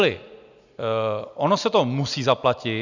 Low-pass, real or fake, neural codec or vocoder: 7.2 kHz; real; none